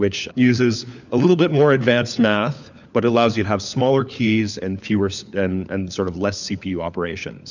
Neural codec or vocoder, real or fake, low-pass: codec, 16 kHz, 4 kbps, FunCodec, trained on LibriTTS, 50 frames a second; fake; 7.2 kHz